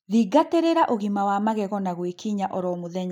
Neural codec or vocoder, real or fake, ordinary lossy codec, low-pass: none; real; none; 19.8 kHz